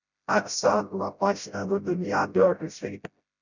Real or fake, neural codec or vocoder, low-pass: fake; codec, 16 kHz, 0.5 kbps, FreqCodec, smaller model; 7.2 kHz